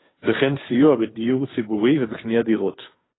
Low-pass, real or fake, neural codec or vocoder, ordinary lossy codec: 7.2 kHz; fake; codec, 16 kHz, 2 kbps, FunCodec, trained on Chinese and English, 25 frames a second; AAC, 16 kbps